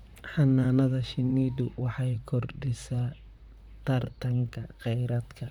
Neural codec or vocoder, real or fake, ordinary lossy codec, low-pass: vocoder, 44.1 kHz, 128 mel bands, Pupu-Vocoder; fake; none; 19.8 kHz